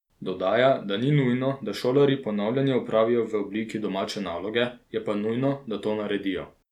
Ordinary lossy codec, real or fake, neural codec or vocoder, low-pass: none; real; none; 19.8 kHz